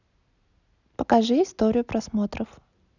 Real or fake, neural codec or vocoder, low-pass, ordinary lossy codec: real; none; 7.2 kHz; none